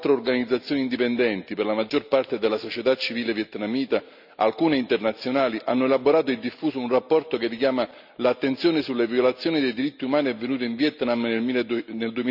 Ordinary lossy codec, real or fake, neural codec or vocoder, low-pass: none; real; none; 5.4 kHz